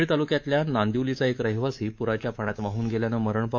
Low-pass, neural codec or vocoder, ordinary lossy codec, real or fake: 7.2 kHz; codec, 24 kHz, 3.1 kbps, DualCodec; AAC, 48 kbps; fake